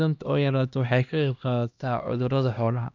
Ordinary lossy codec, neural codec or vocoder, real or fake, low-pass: none; codec, 16 kHz, 1 kbps, X-Codec, HuBERT features, trained on LibriSpeech; fake; 7.2 kHz